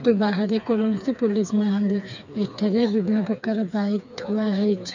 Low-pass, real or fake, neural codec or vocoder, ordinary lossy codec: 7.2 kHz; fake; codec, 16 kHz, 4 kbps, FreqCodec, smaller model; none